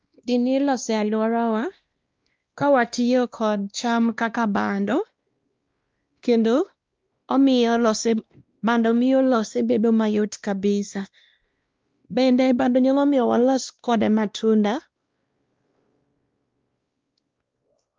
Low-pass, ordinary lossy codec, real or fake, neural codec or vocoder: 7.2 kHz; Opus, 24 kbps; fake; codec, 16 kHz, 1 kbps, X-Codec, WavLM features, trained on Multilingual LibriSpeech